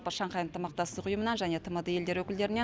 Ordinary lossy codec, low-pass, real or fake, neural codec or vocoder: none; none; real; none